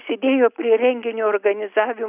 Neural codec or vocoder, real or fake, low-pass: none; real; 3.6 kHz